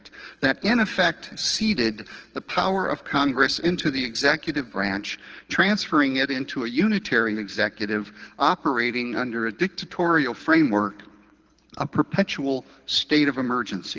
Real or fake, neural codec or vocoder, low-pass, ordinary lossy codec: fake; codec, 24 kHz, 6 kbps, HILCodec; 7.2 kHz; Opus, 16 kbps